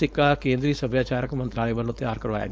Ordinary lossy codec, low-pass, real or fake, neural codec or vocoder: none; none; fake; codec, 16 kHz, 4.8 kbps, FACodec